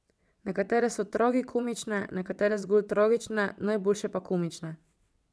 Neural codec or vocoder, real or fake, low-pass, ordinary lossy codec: vocoder, 22.05 kHz, 80 mel bands, WaveNeXt; fake; none; none